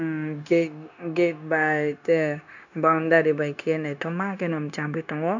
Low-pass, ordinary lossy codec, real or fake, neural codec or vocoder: 7.2 kHz; none; fake; codec, 16 kHz, 0.9 kbps, LongCat-Audio-Codec